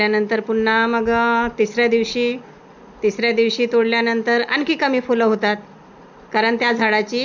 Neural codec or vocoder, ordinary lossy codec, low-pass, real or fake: none; none; 7.2 kHz; real